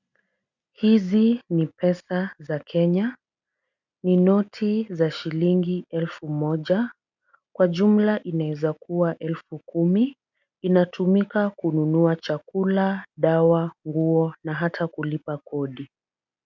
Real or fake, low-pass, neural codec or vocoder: real; 7.2 kHz; none